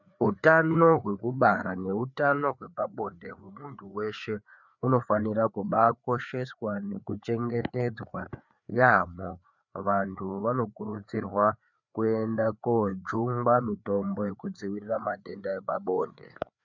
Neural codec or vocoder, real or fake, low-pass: codec, 16 kHz, 4 kbps, FreqCodec, larger model; fake; 7.2 kHz